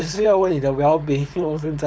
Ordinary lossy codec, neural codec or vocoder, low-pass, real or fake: none; codec, 16 kHz, 4.8 kbps, FACodec; none; fake